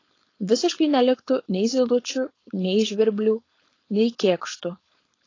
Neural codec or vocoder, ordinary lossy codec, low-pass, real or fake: codec, 16 kHz, 4.8 kbps, FACodec; AAC, 32 kbps; 7.2 kHz; fake